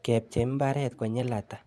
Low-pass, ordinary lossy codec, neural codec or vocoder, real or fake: none; none; vocoder, 24 kHz, 100 mel bands, Vocos; fake